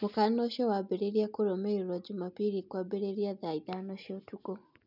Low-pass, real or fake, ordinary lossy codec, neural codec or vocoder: 5.4 kHz; real; none; none